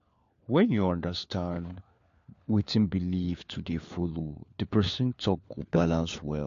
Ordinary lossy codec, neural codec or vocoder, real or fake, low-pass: AAC, 48 kbps; codec, 16 kHz, 4 kbps, FunCodec, trained on LibriTTS, 50 frames a second; fake; 7.2 kHz